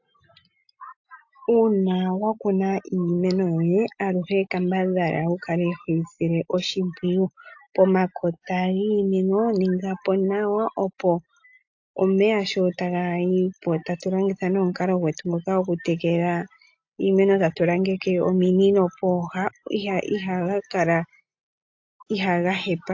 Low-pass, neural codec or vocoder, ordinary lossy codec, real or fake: 7.2 kHz; none; AAC, 48 kbps; real